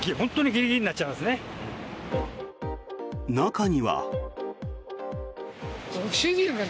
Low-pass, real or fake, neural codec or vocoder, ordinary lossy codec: none; real; none; none